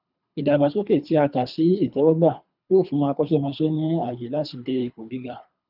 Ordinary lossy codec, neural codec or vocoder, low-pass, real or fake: none; codec, 24 kHz, 3 kbps, HILCodec; 5.4 kHz; fake